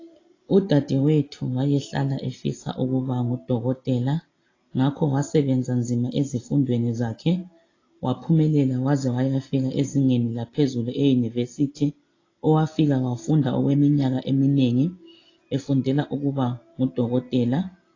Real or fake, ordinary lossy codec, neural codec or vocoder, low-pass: real; AAC, 32 kbps; none; 7.2 kHz